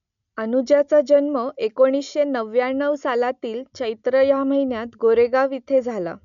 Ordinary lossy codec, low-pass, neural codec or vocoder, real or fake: none; 7.2 kHz; none; real